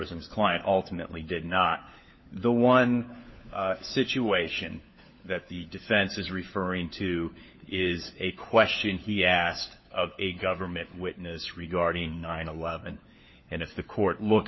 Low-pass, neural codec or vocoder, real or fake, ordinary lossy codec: 7.2 kHz; codec, 16 kHz, 4 kbps, FunCodec, trained on LibriTTS, 50 frames a second; fake; MP3, 24 kbps